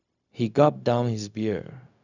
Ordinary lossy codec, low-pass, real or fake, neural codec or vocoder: none; 7.2 kHz; fake; codec, 16 kHz, 0.4 kbps, LongCat-Audio-Codec